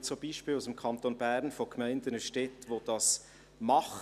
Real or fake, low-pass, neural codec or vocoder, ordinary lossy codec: real; 14.4 kHz; none; none